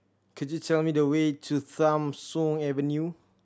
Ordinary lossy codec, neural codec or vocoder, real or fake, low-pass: none; none; real; none